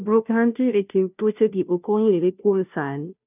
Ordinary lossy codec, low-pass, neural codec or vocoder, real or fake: none; 3.6 kHz; codec, 16 kHz, 0.5 kbps, FunCodec, trained on Chinese and English, 25 frames a second; fake